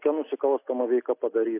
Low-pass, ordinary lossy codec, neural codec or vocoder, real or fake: 3.6 kHz; AAC, 24 kbps; none; real